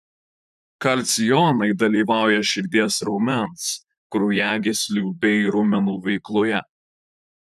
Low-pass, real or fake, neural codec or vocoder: 14.4 kHz; fake; vocoder, 44.1 kHz, 128 mel bands, Pupu-Vocoder